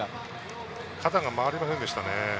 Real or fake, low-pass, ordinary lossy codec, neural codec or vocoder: real; none; none; none